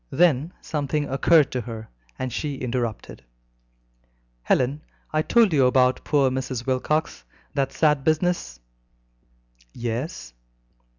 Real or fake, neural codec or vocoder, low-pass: real; none; 7.2 kHz